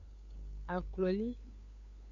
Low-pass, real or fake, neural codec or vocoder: 7.2 kHz; fake; codec, 16 kHz, 8 kbps, FunCodec, trained on LibriTTS, 25 frames a second